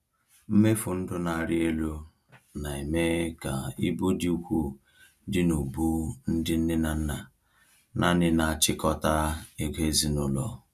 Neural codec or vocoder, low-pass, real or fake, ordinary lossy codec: none; 14.4 kHz; real; none